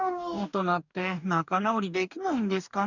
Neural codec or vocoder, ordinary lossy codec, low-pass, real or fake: codec, 44.1 kHz, 2.6 kbps, DAC; none; 7.2 kHz; fake